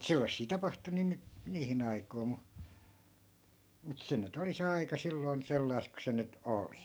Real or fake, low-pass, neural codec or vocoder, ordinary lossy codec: fake; none; codec, 44.1 kHz, 7.8 kbps, DAC; none